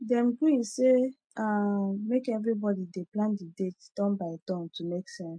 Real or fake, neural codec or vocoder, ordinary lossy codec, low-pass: real; none; MP3, 48 kbps; 9.9 kHz